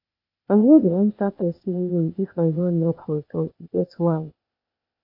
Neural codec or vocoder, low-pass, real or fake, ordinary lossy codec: codec, 16 kHz, 0.8 kbps, ZipCodec; 5.4 kHz; fake; AAC, 32 kbps